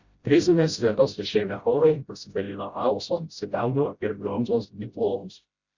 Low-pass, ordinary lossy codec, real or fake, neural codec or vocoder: 7.2 kHz; Opus, 64 kbps; fake; codec, 16 kHz, 0.5 kbps, FreqCodec, smaller model